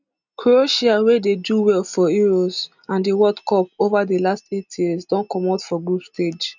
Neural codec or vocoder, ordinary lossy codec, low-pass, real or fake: none; none; 7.2 kHz; real